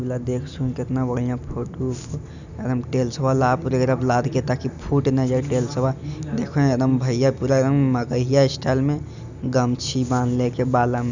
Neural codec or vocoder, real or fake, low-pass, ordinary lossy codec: none; real; 7.2 kHz; none